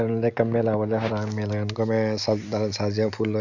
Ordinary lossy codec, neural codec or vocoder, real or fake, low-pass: none; none; real; 7.2 kHz